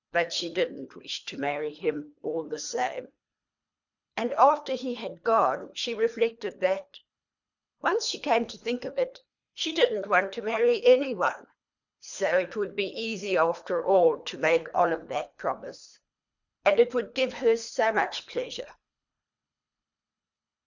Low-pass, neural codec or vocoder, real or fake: 7.2 kHz; codec, 24 kHz, 3 kbps, HILCodec; fake